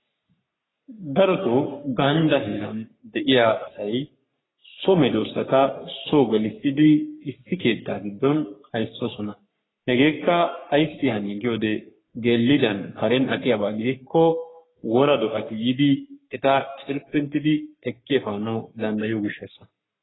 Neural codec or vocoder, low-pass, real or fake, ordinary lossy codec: codec, 44.1 kHz, 3.4 kbps, Pupu-Codec; 7.2 kHz; fake; AAC, 16 kbps